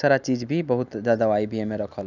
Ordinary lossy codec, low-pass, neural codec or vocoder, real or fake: none; 7.2 kHz; none; real